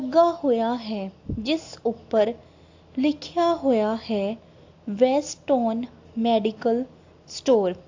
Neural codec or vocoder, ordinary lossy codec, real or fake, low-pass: none; AAC, 48 kbps; real; 7.2 kHz